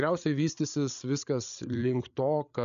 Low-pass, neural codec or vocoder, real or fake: 7.2 kHz; codec, 16 kHz, 8 kbps, FreqCodec, larger model; fake